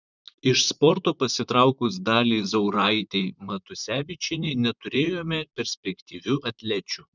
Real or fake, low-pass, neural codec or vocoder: fake; 7.2 kHz; vocoder, 44.1 kHz, 128 mel bands, Pupu-Vocoder